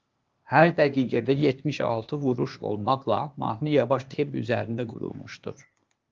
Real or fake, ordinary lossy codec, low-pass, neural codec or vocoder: fake; Opus, 24 kbps; 7.2 kHz; codec, 16 kHz, 0.8 kbps, ZipCodec